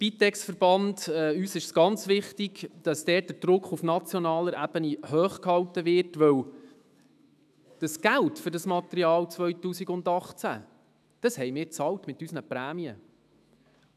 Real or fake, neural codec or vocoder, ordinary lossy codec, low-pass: fake; autoencoder, 48 kHz, 128 numbers a frame, DAC-VAE, trained on Japanese speech; none; 14.4 kHz